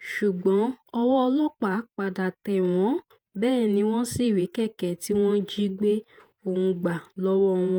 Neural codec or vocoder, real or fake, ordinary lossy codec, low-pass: vocoder, 48 kHz, 128 mel bands, Vocos; fake; none; none